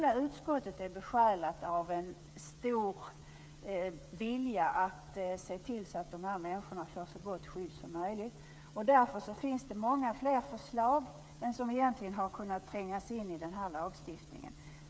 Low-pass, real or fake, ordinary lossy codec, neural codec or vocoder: none; fake; none; codec, 16 kHz, 8 kbps, FreqCodec, smaller model